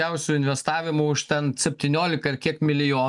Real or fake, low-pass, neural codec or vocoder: real; 10.8 kHz; none